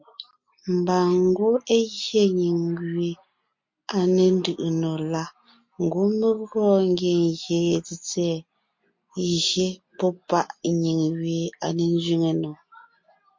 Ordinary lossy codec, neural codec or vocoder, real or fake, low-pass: MP3, 48 kbps; none; real; 7.2 kHz